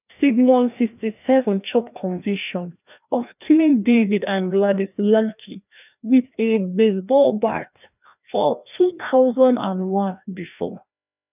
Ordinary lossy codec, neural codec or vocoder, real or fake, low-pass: none; codec, 16 kHz, 1 kbps, FreqCodec, larger model; fake; 3.6 kHz